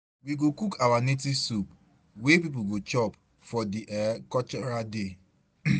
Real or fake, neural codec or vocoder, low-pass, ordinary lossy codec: real; none; none; none